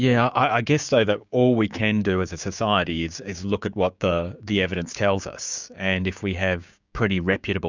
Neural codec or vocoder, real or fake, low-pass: codec, 16 kHz, 6 kbps, DAC; fake; 7.2 kHz